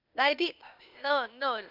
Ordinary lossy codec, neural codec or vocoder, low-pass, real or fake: none; codec, 16 kHz, 0.8 kbps, ZipCodec; 5.4 kHz; fake